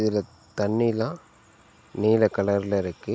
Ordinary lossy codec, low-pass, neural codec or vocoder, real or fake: none; none; none; real